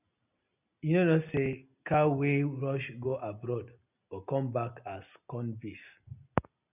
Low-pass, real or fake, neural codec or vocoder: 3.6 kHz; real; none